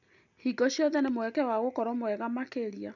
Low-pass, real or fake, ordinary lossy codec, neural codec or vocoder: 7.2 kHz; real; none; none